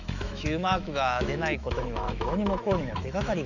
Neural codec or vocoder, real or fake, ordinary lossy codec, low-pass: autoencoder, 48 kHz, 128 numbers a frame, DAC-VAE, trained on Japanese speech; fake; none; 7.2 kHz